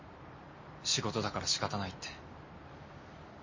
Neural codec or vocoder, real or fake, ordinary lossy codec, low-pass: none; real; MP3, 32 kbps; 7.2 kHz